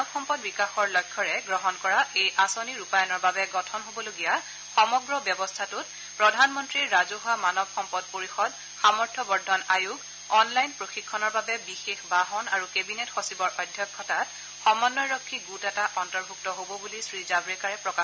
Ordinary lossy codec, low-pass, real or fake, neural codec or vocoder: none; none; real; none